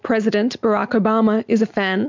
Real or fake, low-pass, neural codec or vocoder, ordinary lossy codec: real; 7.2 kHz; none; MP3, 64 kbps